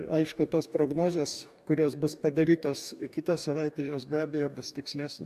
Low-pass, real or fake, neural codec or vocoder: 14.4 kHz; fake; codec, 44.1 kHz, 2.6 kbps, DAC